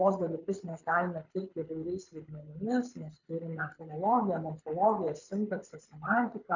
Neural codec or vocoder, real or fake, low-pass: codec, 24 kHz, 6 kbps, HILCodec; fake; 7.2 kHz